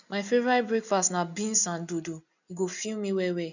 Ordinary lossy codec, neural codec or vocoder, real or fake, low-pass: none; none; real; 7.2 kHz